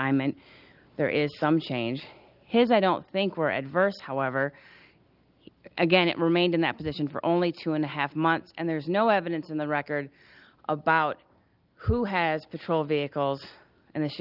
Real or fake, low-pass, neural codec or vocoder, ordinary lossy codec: real; 5.4 kHz; none; Opus, 24 kbps